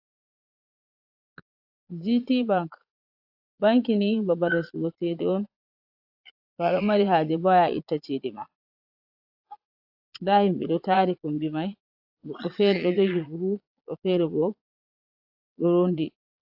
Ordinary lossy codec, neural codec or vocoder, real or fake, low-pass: AAC, 48 kbps; vocoder, 22.05 kHz, 80 mel bands, Vocos; fake; 5.4 kHz